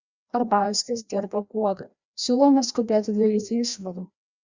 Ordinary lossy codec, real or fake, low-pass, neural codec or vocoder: Opus, 64 kbps; fake; 7.2 kHz; codec, 16 kHz, 1 kbps, FreqCodec, larger model